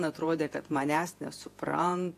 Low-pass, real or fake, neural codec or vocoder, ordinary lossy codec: 14.4 kHz; fake; vocoder, 48 kHz, 128 mel bands, Vocos; AAC, 64 kbps